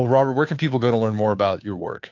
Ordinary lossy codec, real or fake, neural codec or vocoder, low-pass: AAC, 48 kbps; fake; codec, 16 kHz, 2 kbps, FunCodec, trained on Chinese and English, 25 frames a second; 7.2 kHz